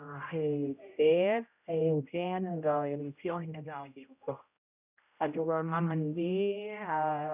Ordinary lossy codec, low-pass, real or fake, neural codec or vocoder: none; 3.6 kHz; fake; codec, 16 kHz, 0.5 kbps, X-Codec, HuBERT features, trained on general audio